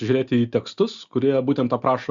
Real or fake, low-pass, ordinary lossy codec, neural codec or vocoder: real; 7.2 kHz; Opus, 64 kbps; none